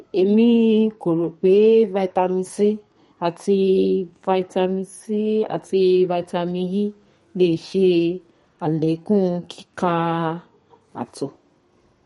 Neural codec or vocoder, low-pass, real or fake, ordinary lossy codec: codec, 32 kHz, 1.9 kbps, SNAC; 14.4 kHz; fake; MP3, 48 kbps